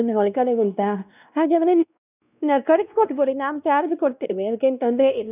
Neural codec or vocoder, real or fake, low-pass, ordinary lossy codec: codec, 16 kHz, 1 kbps, X-Codec, WavLM features, trained on Multilingual LibriSpeech; fake; 3.6 kHz; none